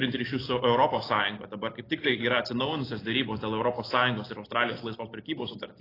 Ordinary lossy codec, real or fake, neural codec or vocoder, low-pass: AAC, 24 kbps; real; none; 5.4 kHz